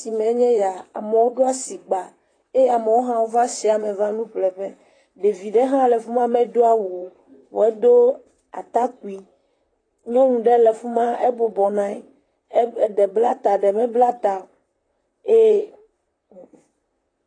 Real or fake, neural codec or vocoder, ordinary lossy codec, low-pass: fake; vocoder, 44.1 kHz, 128 mel bands, Pupu-Vocoder; AAC, 32 kbps; 9.9 kHz